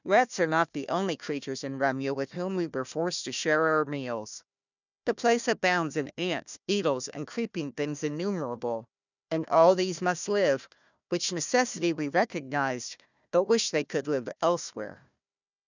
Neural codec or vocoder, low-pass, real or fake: codec, 16 kHz, 1 kbps, FunCodec, trained on Chinese and English, 50 frames a second; 7.2 kHz; fake